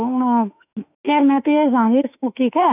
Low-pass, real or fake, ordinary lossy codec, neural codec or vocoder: 3.6 kHz; fake; none; autoencoder, 48 kHz, 32 numbers a frame, DAC-VAE, trained on Japanese speech